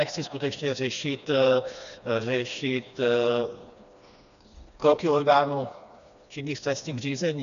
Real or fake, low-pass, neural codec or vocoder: fake; 7.2 kHz; codec, 16 kHz, 2 kbps, FreqCodec, smaller model